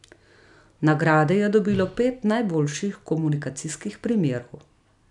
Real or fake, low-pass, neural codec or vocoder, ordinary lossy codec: real; 10.8 kHz; none; none